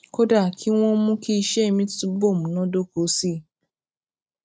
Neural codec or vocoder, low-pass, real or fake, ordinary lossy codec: none; none; real; none